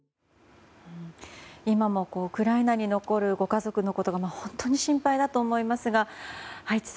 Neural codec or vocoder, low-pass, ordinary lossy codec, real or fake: none; none; none; real